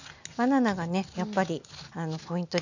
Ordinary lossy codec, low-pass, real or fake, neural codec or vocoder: none; 7.2 kHz; real; none